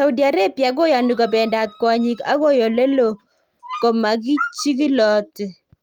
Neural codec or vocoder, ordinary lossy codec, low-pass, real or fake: none; Opus, 32 kbps; 19.8 kHz; real